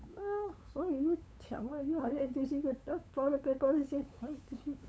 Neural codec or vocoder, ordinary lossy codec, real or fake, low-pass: codec, 16 kHz, 8 kbps, FunCodec, trained on LibriTTS, 25 frames a second; none; fake; none